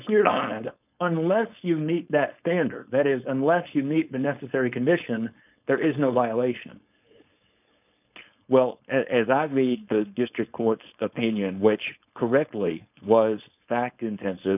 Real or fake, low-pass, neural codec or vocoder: fake; 3.6 kHz; codec, 16 kHz, 4.8 kbps, FACodec